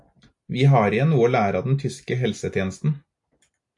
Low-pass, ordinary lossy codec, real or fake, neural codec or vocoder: 10.8 kHz; AAC, 64 kbps; real; none